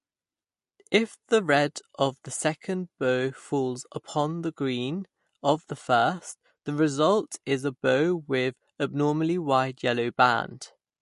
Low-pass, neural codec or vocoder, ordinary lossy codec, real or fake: 14.4 kHz; none; MP3, 48 kbps; real